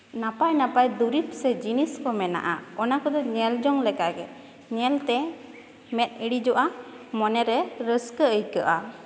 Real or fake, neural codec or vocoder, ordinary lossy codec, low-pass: real; none; none; none